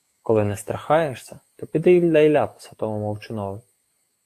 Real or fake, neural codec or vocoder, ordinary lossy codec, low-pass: fake; codec, 44.1 kHz, 7.8 kbps, DAC; AAC, 64 kbps; 14.4 kHz